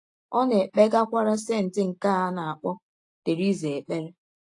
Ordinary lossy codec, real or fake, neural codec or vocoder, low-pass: AAC, 48 kbps; real; none; 10.8 kHz